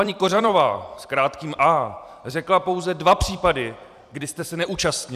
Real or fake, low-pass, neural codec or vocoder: fake; 14.4 kHz; vocoder, 44.1 kHz, 128 mel bands every 256 samples, BigVGAN v2